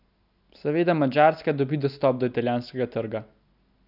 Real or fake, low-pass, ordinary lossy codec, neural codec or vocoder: real; 5.4 kHz; none; none